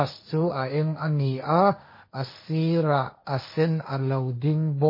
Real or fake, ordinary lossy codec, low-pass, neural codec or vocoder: fake; MP3, 24 kbps; 5.4 kHz; codec, 16 kHz, 1.1 kbps, Voila-Tokenizer